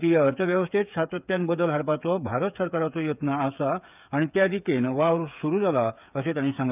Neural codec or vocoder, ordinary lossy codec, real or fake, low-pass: codec, 16 kHz, 8 kbps, FreqCodec, smaller model; none; fake; 3.6 kHz